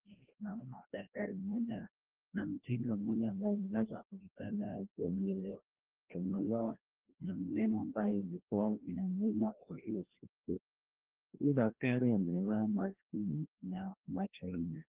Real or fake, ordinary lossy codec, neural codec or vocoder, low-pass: fake; Opus, 16 kbps; codec, 16 kHz, 1 kbps, FreqCodec, larger model; 3.6 kHz